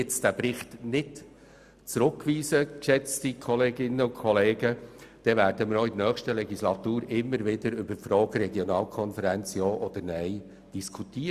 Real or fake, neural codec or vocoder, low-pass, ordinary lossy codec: real; none; 14.4 kHz; Opus, 64 kbps